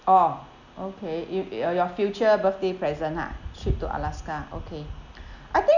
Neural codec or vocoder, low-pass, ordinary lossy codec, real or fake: none; 7.2 kHz; none; real